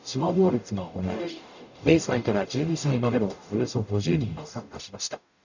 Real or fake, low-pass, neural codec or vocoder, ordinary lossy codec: fake; 7.2 kHz; codec, 44.1 kHz, 0.9 kbps, DAC; none